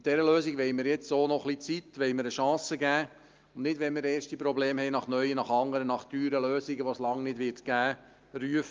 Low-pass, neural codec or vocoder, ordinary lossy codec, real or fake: 7.2 kHz; none; Opus, 32 kbps; real